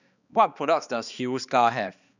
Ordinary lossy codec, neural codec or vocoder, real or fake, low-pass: none; codec, 16 kHz, 2 kbps, X-Codec, HuBERT features, trained on balanced general audio; fake; 7.2 kHz